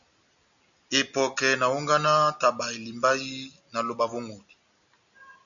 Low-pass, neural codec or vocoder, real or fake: 7.2 kHz; none; real